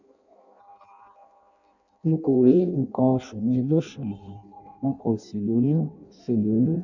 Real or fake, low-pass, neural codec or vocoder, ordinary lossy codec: fake; 7.2 kHz; codec, 16 kHz in and 24 kHz out, 0.6 kbps, FireRedTTS-2 codec; none